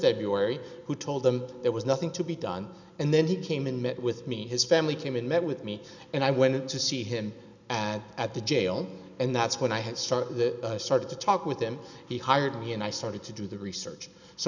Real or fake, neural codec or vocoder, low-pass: real; none; 7.2 kHz